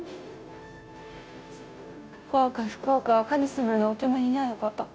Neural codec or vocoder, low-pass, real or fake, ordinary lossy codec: codec, 16 kHz, 0.5 kbps, FunCodec, trained on Chinese and English, 25 frames a second; none; fake; none